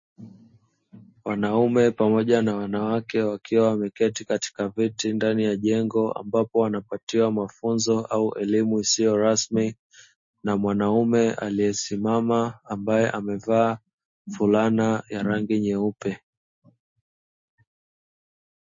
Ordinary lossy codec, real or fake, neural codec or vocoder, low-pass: MP3, 32 kbps; real; none; 7.2 kHz